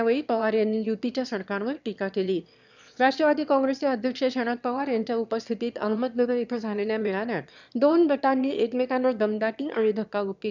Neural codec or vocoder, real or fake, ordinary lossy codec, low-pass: autoencoder, 22.05 kHz, a latent of 192 numbers a frame, VITS, trained on one speaker; fake; none; 7.2 kHz